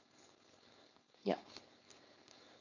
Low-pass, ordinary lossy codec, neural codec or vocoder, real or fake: 7.2 kHz; none; codec, 16 kHz, 4.8 kbps, FACodec; fake